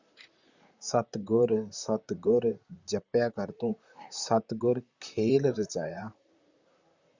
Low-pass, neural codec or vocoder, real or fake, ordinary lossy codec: 7.2 kHz; vocoder, 44.1 kHz, 128 mel bands, Pupu-Vocoder; fake; Opus, 64 kbps